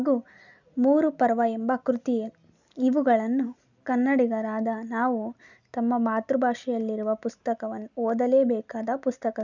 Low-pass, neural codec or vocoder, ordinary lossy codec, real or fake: 7.2 kHz; none; none; real